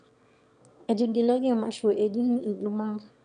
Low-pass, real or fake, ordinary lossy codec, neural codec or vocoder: 9.9 kHz; fake; none; autoencoder, 22.05 kHz, a latent of 192 numbers a frame, VITS, trained on one speaker